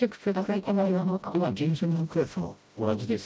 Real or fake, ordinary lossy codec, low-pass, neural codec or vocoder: fake; none; none; codec, 16 kHz, 0.5 kbps, FreqCodec, smaller model